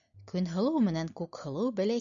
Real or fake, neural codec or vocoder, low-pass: real; none; 7.2 kHz